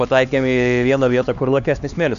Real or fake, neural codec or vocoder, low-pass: fake; codec, 16 kHz, 2 kbps, X-Codec, HuBERT features, trained on LibriSpeech; 7.2 kHz